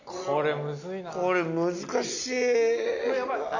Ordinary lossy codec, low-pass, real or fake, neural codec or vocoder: none; 7.2 kHz; real; none